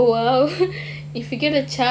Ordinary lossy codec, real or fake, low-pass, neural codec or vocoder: none; real; none; none